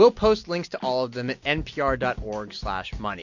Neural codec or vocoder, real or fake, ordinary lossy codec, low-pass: none; real; MP3, 48 kbps; 7.2 kHz